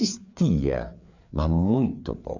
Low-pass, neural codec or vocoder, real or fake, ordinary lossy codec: 7.2 kHz; codec, 16 kHz, 4 kbps, FreqCodec, larger model; fake; none